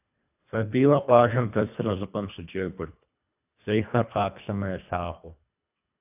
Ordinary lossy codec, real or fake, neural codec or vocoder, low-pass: AAC, 32 kbps; fake; codec, 24 kHz, 1.5 kbps, HILCodec; 3.6 kHz